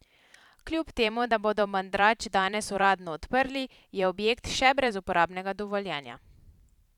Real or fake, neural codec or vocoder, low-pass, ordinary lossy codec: fake; vocoder, 44.1 kHz, 128 mel bands every 512 samples, BigVGAN v2; 19.8 kHz; none